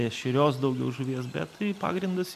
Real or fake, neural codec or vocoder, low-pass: real; none; 14.4 kHz